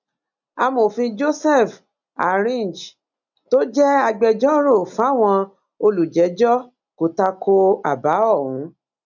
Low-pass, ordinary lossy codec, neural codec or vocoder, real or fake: 7.2 kHz; none; none; real